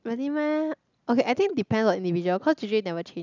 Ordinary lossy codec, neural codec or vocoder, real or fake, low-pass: none; none; real; 7.2 kHz